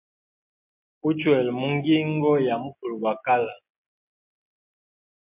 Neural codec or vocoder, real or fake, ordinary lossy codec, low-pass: none; real; MP3, 32 kbps; 3.6 kHz